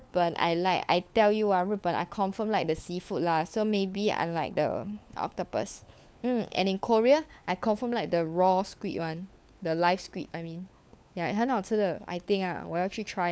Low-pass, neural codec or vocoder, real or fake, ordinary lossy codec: none; codec, 16 kHz, 4 kbps, FunCodec, trained on LibriTTS, 50 frames a second; fake; none